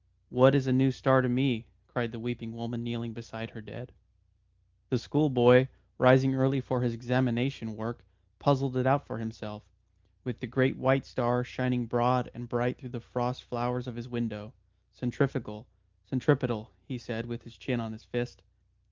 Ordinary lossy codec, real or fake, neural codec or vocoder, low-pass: Opus, 32 kbps; real; none; 7.2 kHz